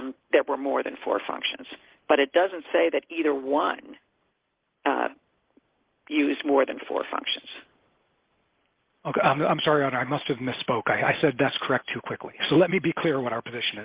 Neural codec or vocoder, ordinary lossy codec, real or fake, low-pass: none; Opus, 16 kbps; real; 3.6 kHz